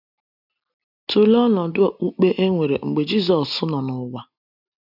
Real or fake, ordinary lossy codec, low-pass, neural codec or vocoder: real; none; 5.4 kHz; none